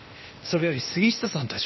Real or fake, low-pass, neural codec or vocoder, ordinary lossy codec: fake; 7.2 kHz; codec, 16 kHz, 0.8 kbps, ZipCodec; MP3, 24 kbps